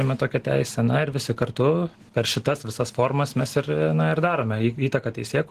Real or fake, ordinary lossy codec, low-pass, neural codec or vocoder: real; Opus, 16 kbps; 14.4 kHz; none